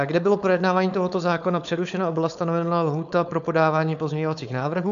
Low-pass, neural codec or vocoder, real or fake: 7.2 kHz; codec, 16 kHz, 4.8 kbps, FACodec; fake